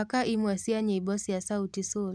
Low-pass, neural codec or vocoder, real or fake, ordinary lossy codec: none; none; real; none